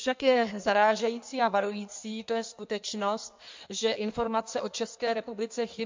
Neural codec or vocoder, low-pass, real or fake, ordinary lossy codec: codec, 16 kHz in and 24 kHz out, 1.1 kbps, FireRedTTS-2 codec; 7.2 kHz; fake; MP3, 64 kbps